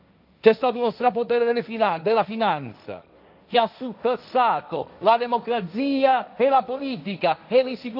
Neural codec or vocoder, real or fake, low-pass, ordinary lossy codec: codec, 16 kHz, 1.1 kbps, Voila-Tokenizer; fake; 5.4 kHz; none